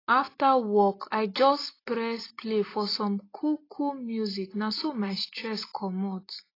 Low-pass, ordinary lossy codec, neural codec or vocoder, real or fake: 5.4 kHz; AAC, 24 kbps; none; real